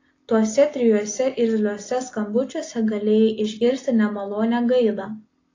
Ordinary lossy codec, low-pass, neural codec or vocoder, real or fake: AAC, 32 kbps; 7.2 kHz; none; real